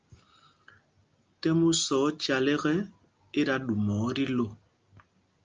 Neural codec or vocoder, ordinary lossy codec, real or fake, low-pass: none; Opus, 24 kbps; real; 7.2 kHz